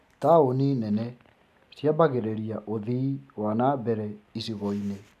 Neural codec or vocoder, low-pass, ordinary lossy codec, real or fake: none; 14.4 kHz; AAC, 96 kbps; real